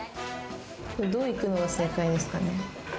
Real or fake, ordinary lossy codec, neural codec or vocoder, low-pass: real; none; none; none